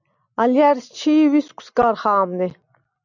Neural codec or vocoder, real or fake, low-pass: none; real; 7.2 kHz